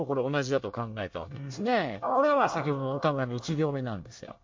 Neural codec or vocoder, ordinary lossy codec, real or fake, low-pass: codec, 24 kHz, 1 kbps, SNAC; MP3, 48 kbps; fake; 7.2 kHz